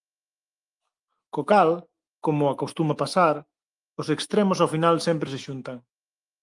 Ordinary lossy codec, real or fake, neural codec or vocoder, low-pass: Opus, 32 kbps; fake; autoencoder, 48 kHz, 128 numbers a frame, DAC-VAE, trained on Japanese speech; 10.8 kHz